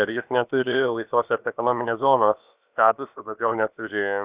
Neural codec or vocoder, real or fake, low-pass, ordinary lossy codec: codec, 16 kHz, about 1 kbps, DyCAST, with the encoder's durations; fake; 3.6 kHz; Opus, 64 kbps